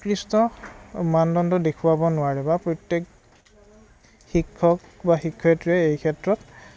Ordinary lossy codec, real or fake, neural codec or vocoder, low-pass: none; real; none; none